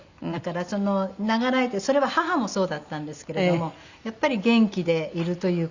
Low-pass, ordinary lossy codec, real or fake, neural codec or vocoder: 7.2 kHz; Opus, 64 kbps; real; none